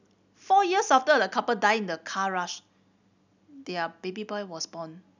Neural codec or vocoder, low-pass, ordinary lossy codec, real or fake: none; 7.2 kHz; none; real